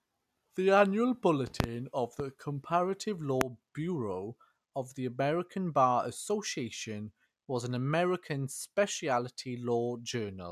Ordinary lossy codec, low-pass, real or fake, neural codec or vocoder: none; 14.4 kHz; real; none